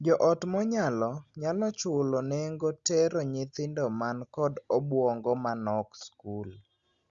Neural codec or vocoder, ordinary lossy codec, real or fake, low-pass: none; Opus, 64 kbps; real; 7.2 kHz